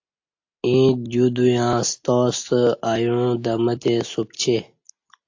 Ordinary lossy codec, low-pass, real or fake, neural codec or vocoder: AAC, 48 kbps; 7.2 kHz; real; none